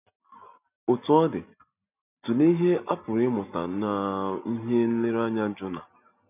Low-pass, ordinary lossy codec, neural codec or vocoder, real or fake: 3.6 kHz; none; none; real